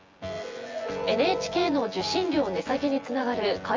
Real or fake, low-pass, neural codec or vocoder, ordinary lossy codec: fake; 7.2 kHz; vocoder, 24 kHz, 100 mel bands, Vocos; Opus, 32 kbps